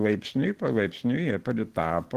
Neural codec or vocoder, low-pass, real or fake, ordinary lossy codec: none; 14.4 kHz; real; Opus, 16 kbps